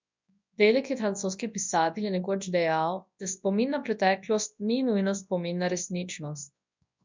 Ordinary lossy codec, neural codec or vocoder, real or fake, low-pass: MP3, 64 kbps; codec, 24 kHz, 0.9 kbps, WavTokenizer, large speech release; fake; 7.2 kHz